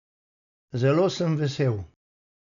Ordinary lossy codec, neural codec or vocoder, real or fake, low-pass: none; none; real; 7.2 kHz